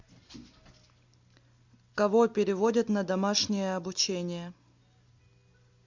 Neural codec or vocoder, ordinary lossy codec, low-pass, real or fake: none; MP3, 64 kbps; 7.2 kHz; real